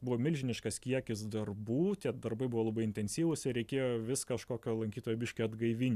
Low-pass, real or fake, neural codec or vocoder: 14.4 kHz; real; none